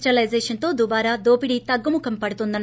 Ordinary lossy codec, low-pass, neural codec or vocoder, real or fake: none; none; none; real